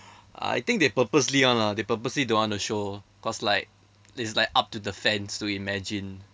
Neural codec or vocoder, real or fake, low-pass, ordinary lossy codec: none; real; none; none